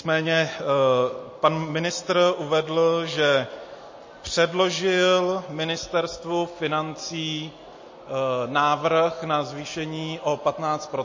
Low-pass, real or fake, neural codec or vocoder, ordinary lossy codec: 7.2 kHz; real; none; MP3, 32 kbps